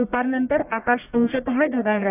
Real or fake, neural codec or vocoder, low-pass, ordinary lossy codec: fake; codec, 44.1 kHz, 1.7 kbps, Pupu-Codec; 3.6 kHz; none